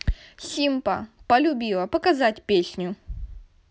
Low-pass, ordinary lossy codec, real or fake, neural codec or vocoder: none; none; real; none